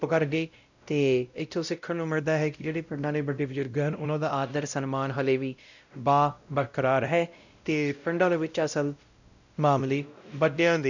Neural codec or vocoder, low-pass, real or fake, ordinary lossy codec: codec, 16 kHz, 0.5 kbps, X-Codec, WavLM features, trained on Multilingual LibriSpeech; 7.2 kHz; fake; none